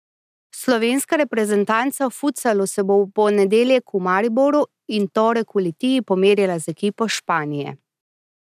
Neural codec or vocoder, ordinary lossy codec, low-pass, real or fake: none; none; 14.4 kHz; real